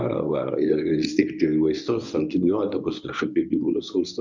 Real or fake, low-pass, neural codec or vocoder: fake; 7.2 kHz; codec, 24 kHz, 0.9 kbps, WavTokenizer, medium speech release version 1